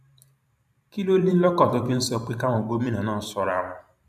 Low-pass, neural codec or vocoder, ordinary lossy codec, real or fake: 14.4 kHz; vocoder, 44.1 kHz, 128 mel bands every 512 samples, BigVGAN v2; none; fake